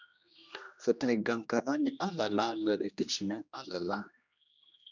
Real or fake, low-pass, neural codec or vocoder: fake; 7.2 kHz; codec, 16 kHz, 1 kbps, X-Codec, HuBERT features, trained on general audio